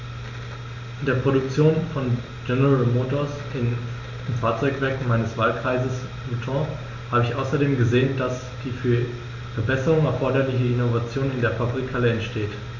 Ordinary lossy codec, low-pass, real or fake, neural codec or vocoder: none; 7.2 kHz; real; none